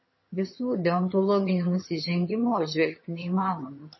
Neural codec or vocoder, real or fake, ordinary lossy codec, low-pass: vocoder, 22.05 kHz, 80 mel bands, HiFi-GAN; fake; MP3, 24 kbps; 7.2 kHz